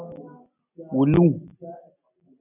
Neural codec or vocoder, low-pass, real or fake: none; 3.6 kHz; real